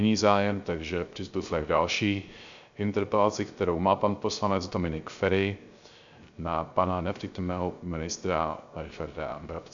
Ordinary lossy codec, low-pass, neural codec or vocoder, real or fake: MP3, 64 kbps; 7.2 kHz; codec, 16 kHz, 0.3 kbps, FocalCodec; fake